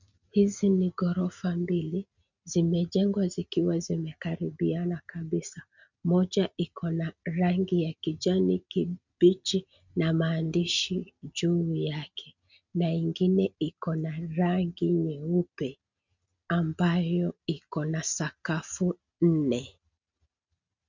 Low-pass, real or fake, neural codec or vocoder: 7.2 kHz; real; none